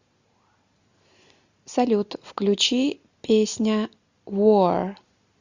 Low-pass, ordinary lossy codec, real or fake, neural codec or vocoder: 7.2 kHz; Opus, 64 kbps; real; none